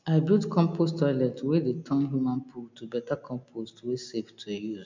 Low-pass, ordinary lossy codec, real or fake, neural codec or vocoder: 7.2 kHz; MP3, 64 kbps; real; none